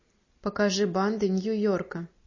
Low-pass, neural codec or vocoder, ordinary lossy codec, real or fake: 7.2 kHz; none; MP3, 32 kbps; real